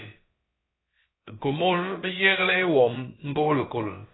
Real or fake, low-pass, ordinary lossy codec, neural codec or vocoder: fake; 7.2 kHz; AAC, 16 kbps; codec, 16 kHz, about 1 kbps, DyCAST, with the encoder's durations